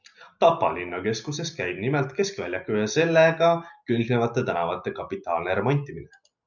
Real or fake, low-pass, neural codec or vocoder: real; 7.2 kHz; none